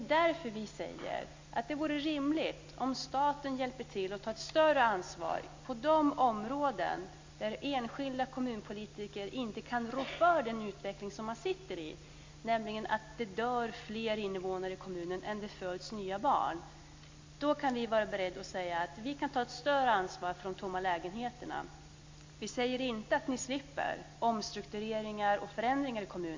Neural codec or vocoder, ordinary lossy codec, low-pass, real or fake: none; MP3, 48 kbps; 7.2 kHz; real